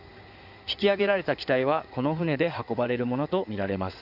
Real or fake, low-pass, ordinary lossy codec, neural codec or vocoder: fake; 5.4 kHz; none; codec, 16 kHz in and 24 kHz out, 2.2 kbps, FireRedTTS-2 codec